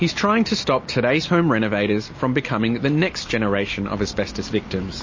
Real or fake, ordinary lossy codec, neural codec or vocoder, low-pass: real; MP3, 32 kbps; none; 7.2 kHz